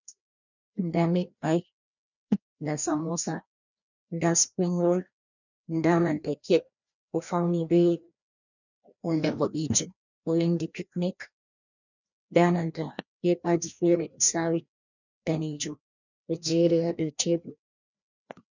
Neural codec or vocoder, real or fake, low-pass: codec, 16 kHz, 1 kbps, FreqCodec, larger model; fake; 7.2 kHz